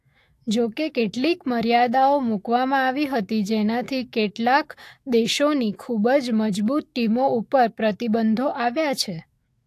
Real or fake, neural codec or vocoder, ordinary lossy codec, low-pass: fake; autoencoder, 48 kHz, 128 numbers a frame, DAC-VAE, trained on Japanese speech; AAC, 64 kbps; 14.4 kHz